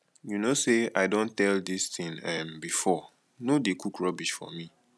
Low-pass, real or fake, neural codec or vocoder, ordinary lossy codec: none; real; none; none